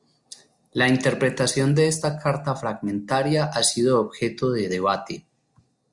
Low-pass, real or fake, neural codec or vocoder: 10.8 kHz; real; none